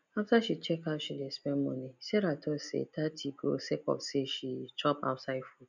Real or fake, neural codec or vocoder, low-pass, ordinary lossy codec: real; none; 7.2 kHz; none